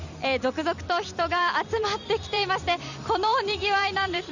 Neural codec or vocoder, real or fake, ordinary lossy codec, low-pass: vocoder, 44.1 kHz, 128 mel bands every 256 samples, BigVGAN v2; fake; none; 7.2 kHz